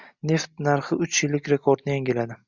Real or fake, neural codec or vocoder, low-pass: real; none; 7.2 kHz